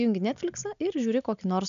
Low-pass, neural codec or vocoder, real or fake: 7.2 kHz; none; real